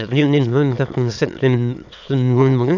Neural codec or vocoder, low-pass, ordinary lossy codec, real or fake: autoencoder, 22.05 kHz, a latent of 192 numbers a frame, VITS, trained on many speakers; 7.2 kHz; none; fake